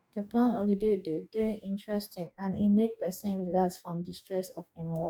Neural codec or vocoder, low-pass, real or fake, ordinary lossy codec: codec, 44.1 kHz, 2.6 kbps, DAC; 19.8 kHz; fake; none